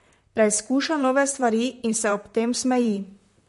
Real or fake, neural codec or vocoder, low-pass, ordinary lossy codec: fake; vocoder, 44.1 kHz, 128 mel bands, Pupu-Vocoder; 14.4 kHz; MP3, 48 kbps